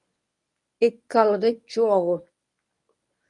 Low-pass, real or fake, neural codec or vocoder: 10.8 kHz; fake; codec, 24 kHz, 0.9 kbps, WavTokenizer, medium speech release version 1